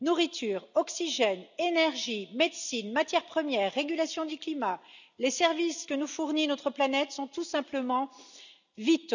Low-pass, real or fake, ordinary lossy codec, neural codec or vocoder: 7.2 kHz; real; none; none